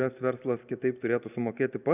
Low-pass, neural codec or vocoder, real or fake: 3.6 kHz; none; real